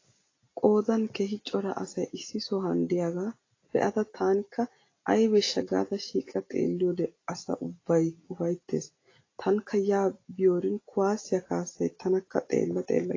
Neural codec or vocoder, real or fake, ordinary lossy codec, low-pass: none; real; AAC, 32 kbps; 7.2 kHz